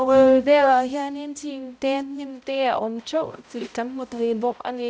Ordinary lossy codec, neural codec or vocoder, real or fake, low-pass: none; codec, 16 kHz, 0.5 kbps, X-Codec, HuBERT features, trained on balanced general audio; fake; none